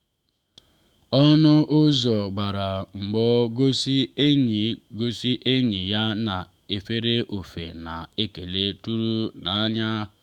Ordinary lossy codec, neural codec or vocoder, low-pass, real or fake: Opus, 64 kbps; autoencoder, 48 kHz, 128 numbers a frame, DAC-VAE, trained on Japanese speech; 19.8 kHz; fake